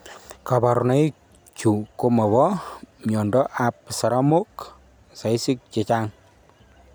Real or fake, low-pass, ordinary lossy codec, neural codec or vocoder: real; none; none; none